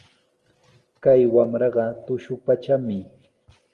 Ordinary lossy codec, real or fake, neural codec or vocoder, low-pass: Opus, 24 kbps; real; none; 10.8 kHz